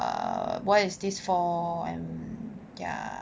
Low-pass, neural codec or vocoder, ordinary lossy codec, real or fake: none; none; none; real